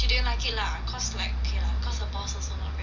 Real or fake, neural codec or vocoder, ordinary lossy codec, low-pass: real; none; MP3, 64 kbps; 7.2 kHz